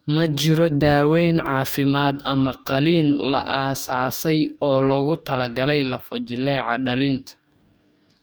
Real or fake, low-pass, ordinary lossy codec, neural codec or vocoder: fake; none; none; codec, 44.1 kHz, 2.6 kbps, DAC